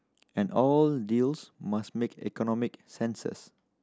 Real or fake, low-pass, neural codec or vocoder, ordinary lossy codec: real; none; none; none